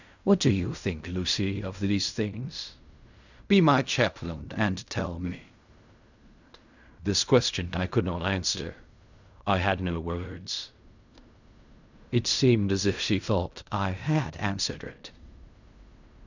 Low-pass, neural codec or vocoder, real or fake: 7.2 kHz; codec, 16 kHz in and 24 kHz out, 0.4 kbps, LongCat-Audio-Codec, fine tuned four codebook decoder; fake